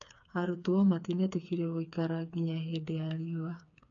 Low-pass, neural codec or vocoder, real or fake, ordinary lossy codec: 7.2 kHz; codec, 16 kHz, 4 kbps, FreqCodec, smaller model; fake; none